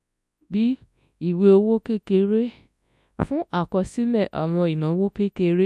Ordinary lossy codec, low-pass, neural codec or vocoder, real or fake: none; none; codec, 24 kHz, 0.9 kbps, WavTokenizer, large speech release; fake